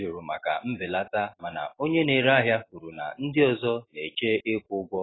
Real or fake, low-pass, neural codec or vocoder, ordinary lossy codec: real; 7.2 kHz; none; AAC, 16 kbps